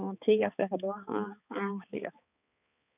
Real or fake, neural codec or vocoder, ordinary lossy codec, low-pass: fake; codec, 16 kHz, 4 kbps, X-Codec, HuBERT features, trained on balanced general audio; none; 3.6 kHz